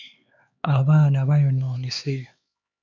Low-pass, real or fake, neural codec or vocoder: 7.2 kHz; fake; codec, 16 kHz, 4 kbps, X-Codec, HuBERT features, trained on LibriSpeech